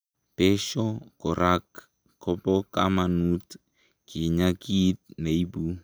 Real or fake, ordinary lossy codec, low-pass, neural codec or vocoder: real; none; none; none